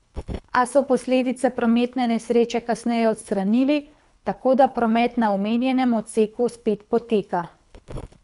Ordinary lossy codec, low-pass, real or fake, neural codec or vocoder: none; 10.8 kHz; fake; codec, 24 kHz, 3 kbps, HILCodec